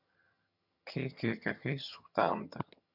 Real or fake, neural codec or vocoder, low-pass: fake; vocoder, 22.05 kHz, 80 mel bands, HiFi-GAN; 5.4 kHz